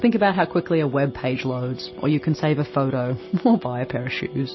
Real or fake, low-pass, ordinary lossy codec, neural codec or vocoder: real; 7.2 kHz; MP3, 24 kbps; none